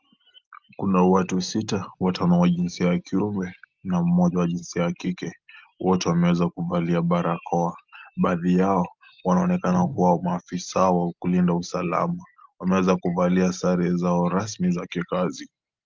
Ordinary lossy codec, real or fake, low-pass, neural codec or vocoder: Opus, 24 kbps; real; 7.2 kHz; none